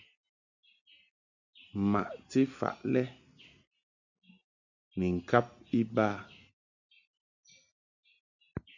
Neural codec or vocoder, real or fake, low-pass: none; real; 7.2 kHz